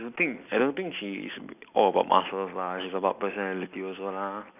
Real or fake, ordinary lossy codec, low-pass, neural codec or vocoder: real; none; 3.6 kHz; none